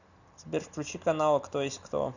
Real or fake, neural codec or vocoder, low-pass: real; none; 7.2 kHz